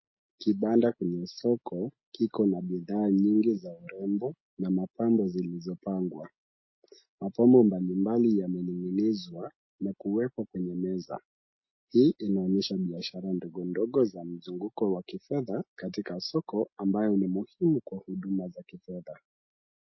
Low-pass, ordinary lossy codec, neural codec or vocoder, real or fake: 7.2 kHz; MP3, 24 kbps; none; real